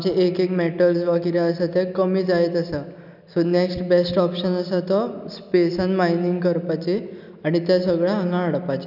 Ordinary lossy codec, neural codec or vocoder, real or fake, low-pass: none; none; real; 5.4 kHz